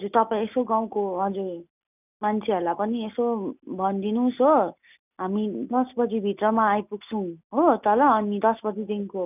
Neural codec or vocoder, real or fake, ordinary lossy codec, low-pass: none; real; none; 3.6 kHz